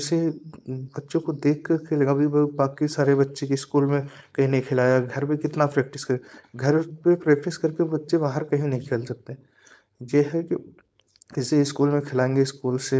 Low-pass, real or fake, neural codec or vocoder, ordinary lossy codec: none; fake; codec, 16 kHz, 4.8 kbps, FACodec; none